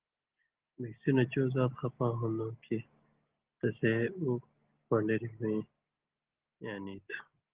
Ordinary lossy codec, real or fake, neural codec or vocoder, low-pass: Opus, 16 kbps; real; none; 3.6 kHz